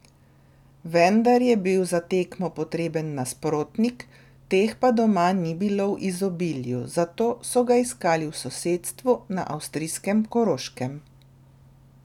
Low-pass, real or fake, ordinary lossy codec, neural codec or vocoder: 19.8 kHz; real; none; none